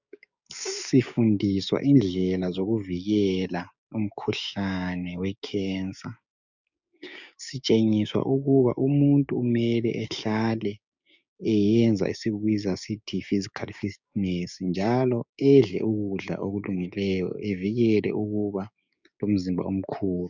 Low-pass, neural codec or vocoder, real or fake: 7.2 kHz; codec, 16 kHz, 6 kbps, DAC; fake